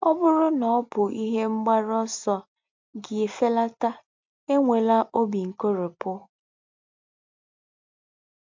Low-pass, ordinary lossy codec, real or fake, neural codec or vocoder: 7.2 kHz; MP3, 48 kbps; real; none